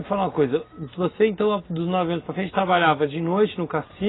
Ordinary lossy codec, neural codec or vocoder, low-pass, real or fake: AAC, 16 kbps; none; 7.2 kHz; real